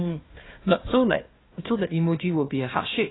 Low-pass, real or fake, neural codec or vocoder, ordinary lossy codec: 7.2 kHz; fake; codec, 16 kHz, 1 kbps, FunCodec, trained on Chinese and English, 50 frames a second; AAC, 16 kbps